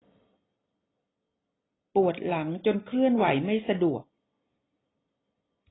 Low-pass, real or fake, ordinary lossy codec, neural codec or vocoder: 7.2 kHz; real; AAC, 16 kbps; none